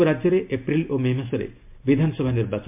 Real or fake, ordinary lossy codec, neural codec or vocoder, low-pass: real; MP3, 32 kbps; none; 3.6 kHz